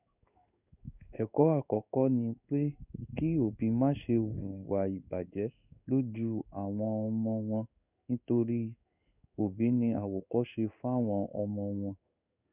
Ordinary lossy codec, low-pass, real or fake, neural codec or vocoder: none; 3.6 kHz; fake; codec, 16 kHz in and 24 kHz out, 1 kbps, XY-Tokenizer